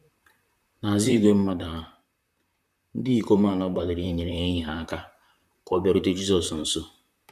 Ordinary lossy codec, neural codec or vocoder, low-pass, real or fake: none; vocoder, 44.1 kHz, 128 mel bands, Pupu-Vocoder; 14.4 kHz; fake